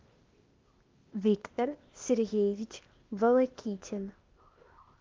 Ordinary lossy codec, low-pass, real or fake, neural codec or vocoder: Opus, 24 kbps; 7.2 kHz; fake; codec, 16 kHz, 0.8 kbps, ZipCodec